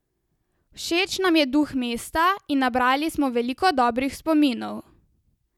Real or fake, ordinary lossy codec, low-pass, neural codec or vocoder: real; none; 19.8 kHz; none